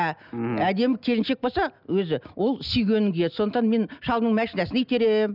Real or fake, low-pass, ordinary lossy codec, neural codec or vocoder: real; 5.4 kHz; none; none